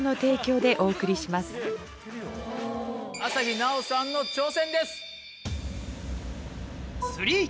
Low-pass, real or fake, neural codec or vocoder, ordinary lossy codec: none; real; none; none